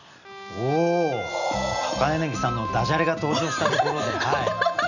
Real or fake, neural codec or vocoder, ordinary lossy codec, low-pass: real; none; none; 7.2 kHz